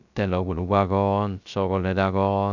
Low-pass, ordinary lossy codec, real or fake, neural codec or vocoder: 7.2 kHz; none; fake; codec, 16 kHz, 0.3 kbps, FocalCodec